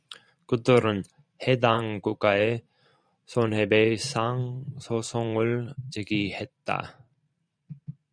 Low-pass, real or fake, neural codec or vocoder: 9.9 kHz; fake; vocoder, 44.1 kHz, 128 mel bands every 512 samples, BigVGAN v2